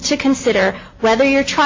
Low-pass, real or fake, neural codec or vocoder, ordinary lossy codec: 7.2 kHz; real; none; MP3, 32 kbps